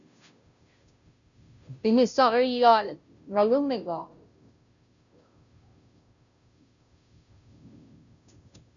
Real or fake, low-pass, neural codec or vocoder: fake; 7.2 kHz; codec, 16 kHz, 0.5 kbps, FunCodec, trained on Chinese and English, 25 frames a second